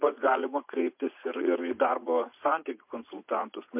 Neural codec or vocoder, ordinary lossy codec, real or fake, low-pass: vocoder, 44.1 kHz, 80 mel bands, Vocos; MP3, 32 kbps; fake; 3.6 kHz